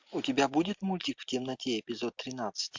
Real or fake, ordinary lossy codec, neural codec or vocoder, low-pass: real; MP3, 64 kbps; none; 7.2 kHz